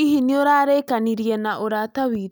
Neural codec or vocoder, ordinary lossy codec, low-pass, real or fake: none; none; none; real